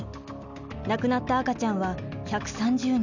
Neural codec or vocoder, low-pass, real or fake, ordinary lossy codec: none; 7.2 kHz; real; none